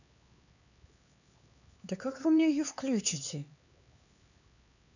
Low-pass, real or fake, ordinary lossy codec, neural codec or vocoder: 7.2 kHz; fake; none; codec, 16 kHz, 4 kbps, X-Codec, HuBERT features, trained on LibriSpeech